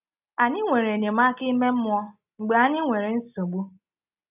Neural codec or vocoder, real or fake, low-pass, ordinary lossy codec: none; real; 3.6 kHz; none